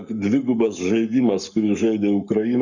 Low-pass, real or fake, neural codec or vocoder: 7.2 kHz; fake; codec, 44.1 kHz, 7.8 kbps, Pupu-Codec